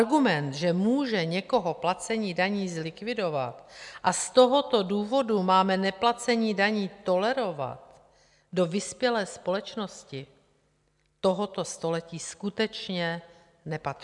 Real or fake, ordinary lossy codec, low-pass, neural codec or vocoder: real; MP3, 96 kbps; 10.8 kHz; none